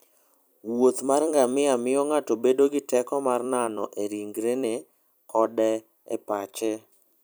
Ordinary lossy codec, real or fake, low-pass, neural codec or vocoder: none; fake; none; vocoder, 44.1 kHz, 128 mel bands every 256 samples, BigVGAN v2